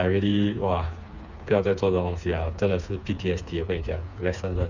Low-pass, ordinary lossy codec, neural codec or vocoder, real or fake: 7.2 kHz; none; codec, 16 kHz, 4 kbps, FreqCodec, smaller model; fake